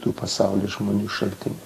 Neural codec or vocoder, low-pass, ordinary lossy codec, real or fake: codec, 44.1 kHz, 7.8 kbps, DAC; 14.4 kHz; AAC, 48 kbps; fake